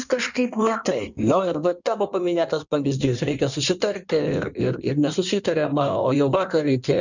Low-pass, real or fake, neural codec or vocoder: 7.2 kHz; fake; codec, 16 kHz in and 24 kHz out, 1.1 kbps, FireRedTTS-2 codec